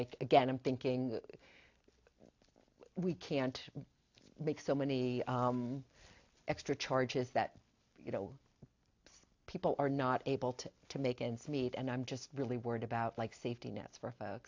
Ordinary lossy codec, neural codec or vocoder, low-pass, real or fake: AAC, 48 kbps; none; 7.2 kHz; real